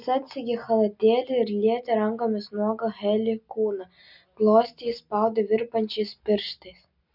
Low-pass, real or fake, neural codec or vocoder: 5.4 kHz; real; none